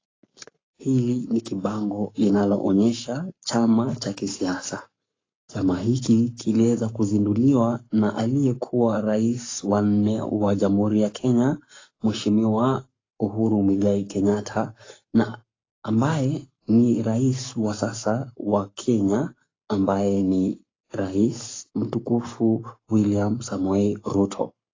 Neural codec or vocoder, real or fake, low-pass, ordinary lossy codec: codec, 44.1 kHz, 7.8 kbps, Pupu-Codec; fake; 7.2 kHz; AAC, 32 kbps